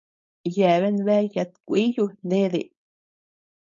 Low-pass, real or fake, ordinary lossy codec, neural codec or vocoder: 7.2 kHz; fake; MP3, 64 kbps; codec, 16 kHz, 4.8 kbps, FACodec